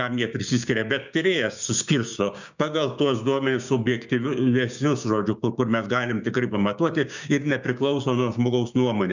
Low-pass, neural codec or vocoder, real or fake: 7.2 kHz; codec, 16 kHz, 6 kbps, DAC; fake